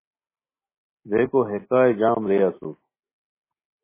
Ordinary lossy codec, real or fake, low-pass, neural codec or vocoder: MP3, 16 kbps; real; 3.6 kHz; none